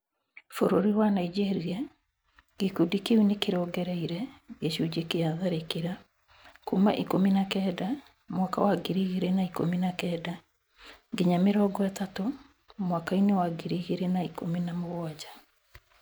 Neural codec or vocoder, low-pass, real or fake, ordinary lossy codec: none; none; real; none